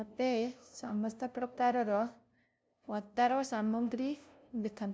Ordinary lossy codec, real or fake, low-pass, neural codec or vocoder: none; fake; none; codec, 16 kHz, 0.5 kbps, FunCodec, trained on LibriTTS, 25 frames a second